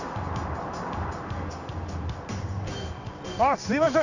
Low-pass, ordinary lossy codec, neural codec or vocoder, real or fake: 7.2 kHz; none; codec, 16 kHz in and 24 kHz out, 1 kbps, XY-Tokenizer; fake